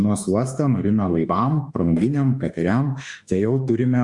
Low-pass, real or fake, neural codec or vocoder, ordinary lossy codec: 10.8 kHz; fake; autoencoder, 48 kHz, 32 numbers a frame, DAC-VAE, trained on Japanese speech; Opus, 64 kbps